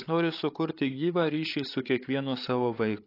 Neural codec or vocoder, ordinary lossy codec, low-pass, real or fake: codec, 16 kHz, 8 kbps, FreqCodec, larger model; AAC, 32 kbps; 5.4 kHz; fake